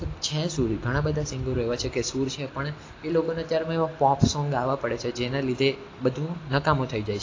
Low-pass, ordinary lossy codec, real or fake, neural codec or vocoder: 7.2 kHz; AAC, 32 kbps; real; none